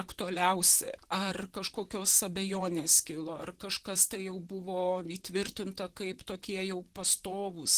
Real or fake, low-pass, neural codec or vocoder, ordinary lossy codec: fake; 14.4 kHz; vocoder, 44.1 kHz, 128 mel bands, Pupu-Vocoder; Opus, 16 kbps